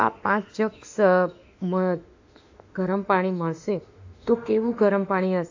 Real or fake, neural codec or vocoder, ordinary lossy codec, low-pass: fake; autoencoder, 48 kHz, 32 numbers a frame, DAC-VAE, trained on Japanese speech; AAC, 48 kbps; 7.2 kHz